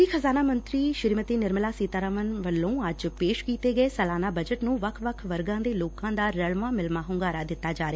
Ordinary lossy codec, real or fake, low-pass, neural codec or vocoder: none; real; none; none